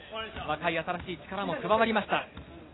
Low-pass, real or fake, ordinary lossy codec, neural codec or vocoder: 7.2 kHz; real; AAC, 16 kbps; none